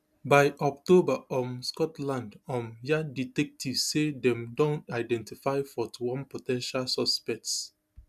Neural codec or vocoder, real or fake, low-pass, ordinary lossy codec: none; real; 14.4 kHz; none